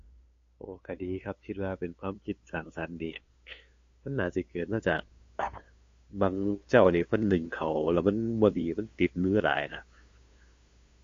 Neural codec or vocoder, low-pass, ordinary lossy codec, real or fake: codec, 16 kHz, 2 kbps, FunCodec, trained on LibriTTS, 25 frames a second; 7.2 kHz; AAC, 48 kbps; fake